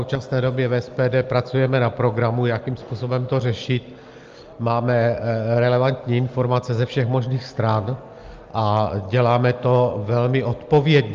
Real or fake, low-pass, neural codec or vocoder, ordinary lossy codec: real; 7.2 kHz; none; Opus, 24 kbps